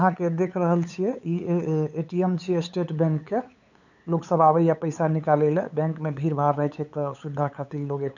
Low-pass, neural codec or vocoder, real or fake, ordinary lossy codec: 7.2 kHz; codec, 16 kHz, 8 kbps, FunCodec, trained on LibriTTS, 25 frames a second; fake; none